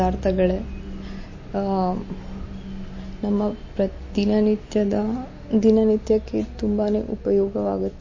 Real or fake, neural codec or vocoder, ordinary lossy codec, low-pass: real; none; MP3, 32 kbps; 7.2 kHz